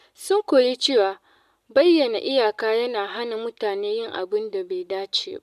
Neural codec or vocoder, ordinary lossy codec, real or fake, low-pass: none; none; real; 14.4 kHz